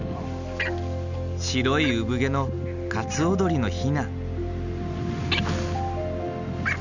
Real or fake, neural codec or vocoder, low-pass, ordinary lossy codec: real; none; 7.2 kHz; none